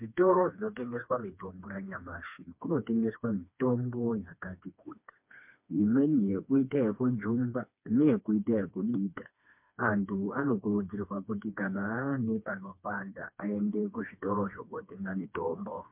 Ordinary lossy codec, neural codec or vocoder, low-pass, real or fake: MP3, 32 kbps; codec, 16 kHz, 2 kbps, FreqCodec, smaller model; 3.6 kHz; fake